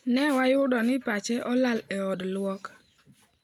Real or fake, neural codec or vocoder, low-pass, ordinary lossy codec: real; none; 19.8 kHz; none